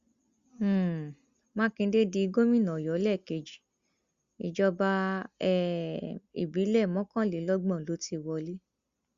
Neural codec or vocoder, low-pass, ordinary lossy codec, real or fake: none; 7.2 kHz; Opus, 64 kbps; real